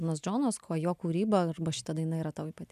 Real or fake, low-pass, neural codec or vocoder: real; 14.4 kHz; none